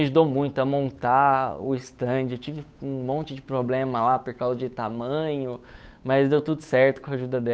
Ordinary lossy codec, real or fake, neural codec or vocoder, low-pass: none; fake; codec, 16 kHz, 8 kbps, FunCodec, trained on Chinese and English, 25 frames a second; none